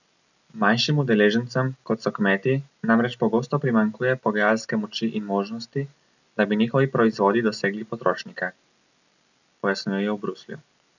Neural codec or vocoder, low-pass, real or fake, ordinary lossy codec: none; 7.2 kHz; real; none